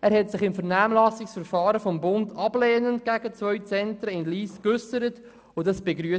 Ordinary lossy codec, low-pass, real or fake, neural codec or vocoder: none; none; real; none